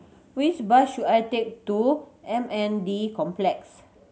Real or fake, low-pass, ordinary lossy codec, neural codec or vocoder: real; none; none; none